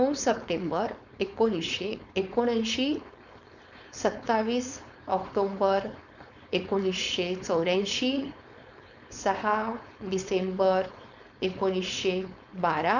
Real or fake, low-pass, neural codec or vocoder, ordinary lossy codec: fake; 7.2 kHz; codec, 16 kHz, 4.8 kbps, FACodec; none